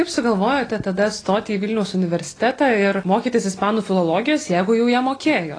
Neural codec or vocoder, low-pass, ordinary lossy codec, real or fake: none; 9.9 kHz; AAC, 32 kbps; real